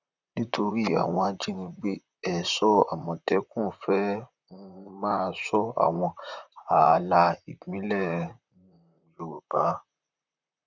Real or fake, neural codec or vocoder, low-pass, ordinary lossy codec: fake; vocoder, 44.1 kHz, 128 mel bands, Pupu-Vocoder; 7.2 kHz; none